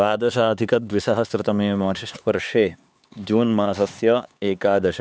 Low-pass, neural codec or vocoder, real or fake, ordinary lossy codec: none; codec, 16 kHz, 4 kbps, X-Codec, HuBERT features, trained on LibriSpeech; fake; none